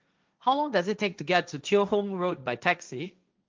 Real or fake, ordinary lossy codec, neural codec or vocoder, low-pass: fake; Opus, 24 kbps; codec, 16 kHz, 1.1 kbps, Voila-Tokenizer; 7.2 kHz